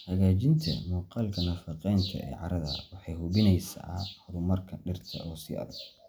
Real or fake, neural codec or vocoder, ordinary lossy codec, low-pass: real; none; none; none